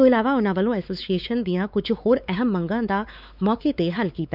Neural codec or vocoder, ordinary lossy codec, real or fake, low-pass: codec, 16 kHz, 4 kbps, X-Codec, WavLM features, trained on Multilingual LibriSpeech; none; fake; 5.4 kHz